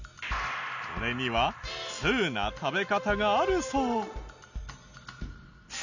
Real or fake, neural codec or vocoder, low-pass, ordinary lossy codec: real; none; 7.2 kHz; none